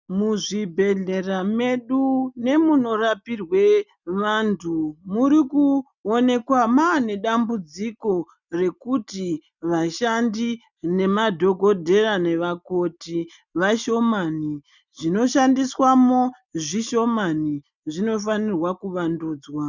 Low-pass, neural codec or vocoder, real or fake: 7.2 kHz; none; real